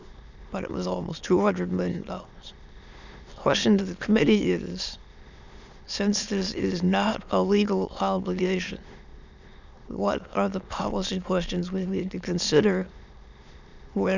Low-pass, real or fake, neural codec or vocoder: 7.2 kHz; fake; autoencoder, 22.05 kHz, a latent of 192 numbers a frame, VITS, trained on many speakers